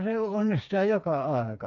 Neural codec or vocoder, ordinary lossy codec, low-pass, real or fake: codec, 16 kHz, 4 kbps, FreqCodec, smaller model; none; 7.2 kHz; fake